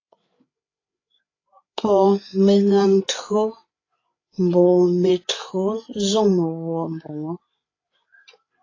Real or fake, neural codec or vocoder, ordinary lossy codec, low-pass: fake; codec, 16 kHz, 8 kbps, FreqCodec, larger model; AAC, 32 kbps; 7.2 kHz